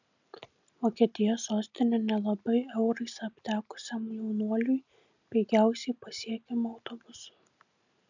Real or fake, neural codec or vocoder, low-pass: real; none; 7.2 kHz